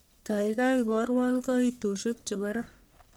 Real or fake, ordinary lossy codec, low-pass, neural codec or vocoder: fake; none; none; codec, 44.1 kHz, 1.7 kbps, Pupu-Codec